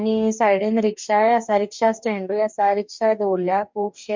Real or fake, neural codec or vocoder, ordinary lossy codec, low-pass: fake; codec, 44.1 kHz, 2.6 kbps, DAC; MP3, 64 kbps; 7.2 kHz